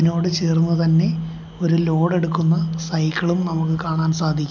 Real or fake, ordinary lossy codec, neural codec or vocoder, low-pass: real; none; none; 7.2 kHz